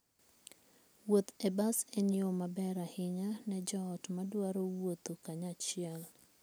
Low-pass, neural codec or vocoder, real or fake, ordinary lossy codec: none; none; real; none